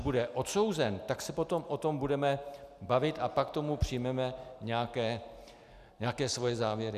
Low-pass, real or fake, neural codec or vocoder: 14.4 kHz; real; none